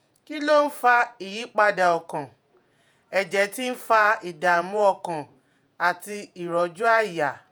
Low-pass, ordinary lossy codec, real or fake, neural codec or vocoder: none; none; fake; vocoder, 48 kHz, 128 mel bands, Vocos